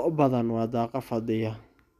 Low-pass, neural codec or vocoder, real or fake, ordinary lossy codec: 14.4 kHz; none; real; none